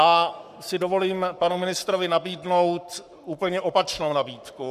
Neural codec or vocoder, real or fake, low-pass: codec, 44.1 kHz, 7.8 kbps, Pupu-Codec; fake; 14.4 kHz